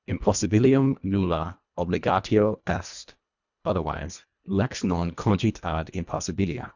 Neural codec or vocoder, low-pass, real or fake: codec, 24 kHz, 1.5 kbps, HILCodec; 7.2 kHz; fake